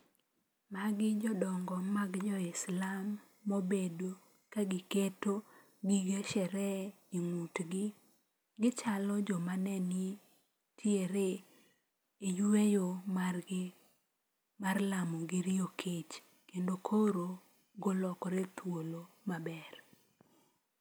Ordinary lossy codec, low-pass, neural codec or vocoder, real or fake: none; none; none; real